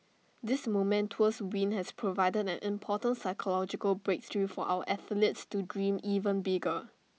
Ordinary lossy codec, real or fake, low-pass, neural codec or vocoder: none; real; none; none